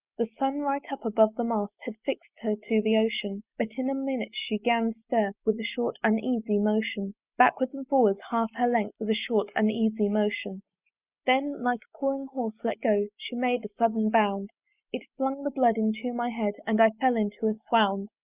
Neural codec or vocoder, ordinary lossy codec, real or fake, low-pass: none; Opus, 64 kbps; real; 3.6 kHz